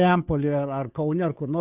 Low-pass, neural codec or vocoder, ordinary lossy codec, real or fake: 3.6 kHz; codec, 16 kHz, 2 kbps, FunCodec, trained on Chinese and English, 25 frames a second; Opus, 64 kbps; fake